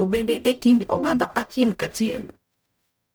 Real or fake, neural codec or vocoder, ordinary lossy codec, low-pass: fake; codec, 44.1 kHz, 0.9 kbps, DAC; none; none